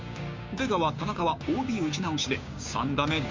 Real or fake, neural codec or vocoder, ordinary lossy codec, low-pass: fake; codec, 16 kHz, 6 kbps, DAC; MP3, 48 kbps; 7.2 kHz